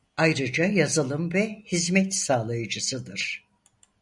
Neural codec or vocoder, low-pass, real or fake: none; 10.8 kHz; real